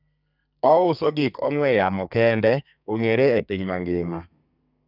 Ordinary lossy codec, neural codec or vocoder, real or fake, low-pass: none; codec, 32 kHz, 1.9 kbps, SNAC; fake; 5.4 kHz